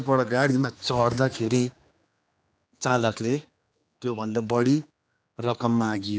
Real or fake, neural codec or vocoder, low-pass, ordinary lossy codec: fake; codec, 16 kHz, 2 kbps, X-Codec, HuBERT features, trained on general audio; none; none